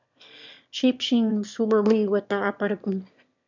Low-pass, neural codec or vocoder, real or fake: 7.2 kHz; autoencoder, 22.05 kHz, a latent of 192 numbers a frame, VITS, trained on one speaker; fake